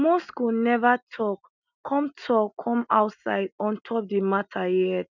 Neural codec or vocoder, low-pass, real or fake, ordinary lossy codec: none; 7.2 kHz; real; none